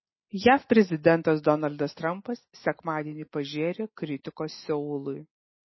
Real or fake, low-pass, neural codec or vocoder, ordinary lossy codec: real; 7.2 kHz; none; MP3, 24 kbps